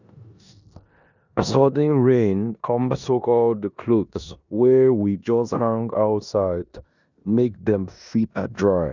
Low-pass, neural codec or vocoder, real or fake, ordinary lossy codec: 7.2 kHz; codec, 16 kHz in and 24 kHz out, 0.9 kbps, LongCat-Audio-Codec, four codebook decoder; fake; none